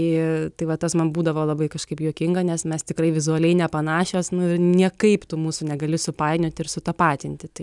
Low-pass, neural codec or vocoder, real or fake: 10.8 kHz; none; real